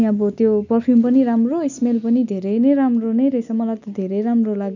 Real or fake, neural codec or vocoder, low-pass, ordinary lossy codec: real; none; 7.2 kHz; none